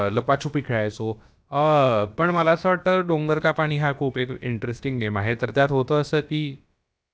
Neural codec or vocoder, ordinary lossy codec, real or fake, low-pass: codec, 16 kHz, about 1 kbps, DyCAST, with the encoder's durations; none; fake; none